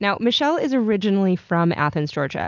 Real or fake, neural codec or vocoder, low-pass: real; none; 7.2 kHz